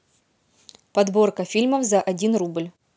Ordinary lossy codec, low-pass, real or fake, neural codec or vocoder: none; none; real; none